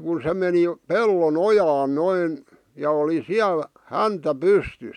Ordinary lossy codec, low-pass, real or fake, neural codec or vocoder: none; 19.8 kHz; real; none